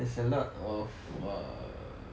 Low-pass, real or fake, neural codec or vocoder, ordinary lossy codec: none; real; none; none